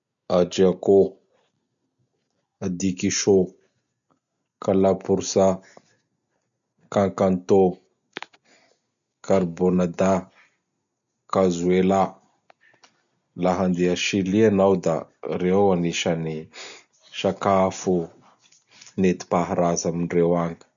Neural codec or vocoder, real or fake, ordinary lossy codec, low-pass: none; real; none; 7.2 kHz